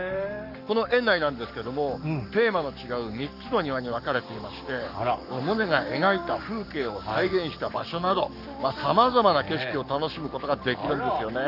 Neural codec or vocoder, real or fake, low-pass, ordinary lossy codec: codec, 44.1 kHz, 7.8 kbps, Pupu-Codec; fake; 5.4 kHz; none